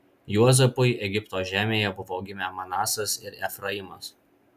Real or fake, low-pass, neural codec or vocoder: real; 14.4 kHz; none